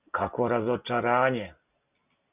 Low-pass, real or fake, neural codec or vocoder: 3.6 kHz; real; none